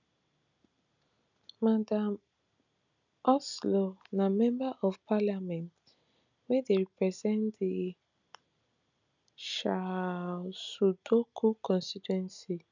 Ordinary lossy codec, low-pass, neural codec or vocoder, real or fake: none; 7.2 kHz; none; real